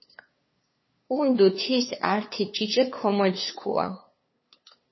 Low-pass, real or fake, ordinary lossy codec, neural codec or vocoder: 7.2 kHz; fake; MP3, 24 kbps; codec, 16 kHz, 2 kbps, FunCodec, trained on LibriTTS, 25 frames a second